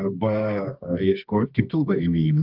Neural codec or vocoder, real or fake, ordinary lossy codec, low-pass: codec, 24 kHz, 0.9 kbps, WavTokenizer, medium music audio release; fake; Opus, 32 kbps; 5.4 kHz